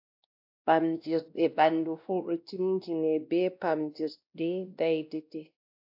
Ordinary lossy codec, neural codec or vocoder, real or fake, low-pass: AAC, 48 kbps; codec, 16 kHz, 1 kbps, X-Codec, WavLM features, trained on Multilingual LibriSpeech; fake; 5.4 kHz